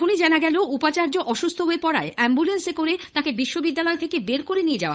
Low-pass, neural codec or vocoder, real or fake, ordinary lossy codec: none; codec, 16 kHz, 8 kbps, FunCodec, trained on Chinese and English, 25 frames a second; fake; none